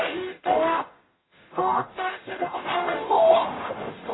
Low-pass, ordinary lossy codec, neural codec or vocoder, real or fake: 7.2 kHz; AAC, 16 kbps; codec, 44.1 kHz, 0.9 kbps, DAC; fake